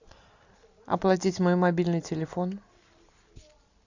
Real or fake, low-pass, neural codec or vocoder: real; 7.2 kHz; none